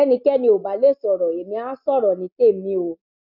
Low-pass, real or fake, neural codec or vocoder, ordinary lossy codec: 5.4 kHz; real; none; none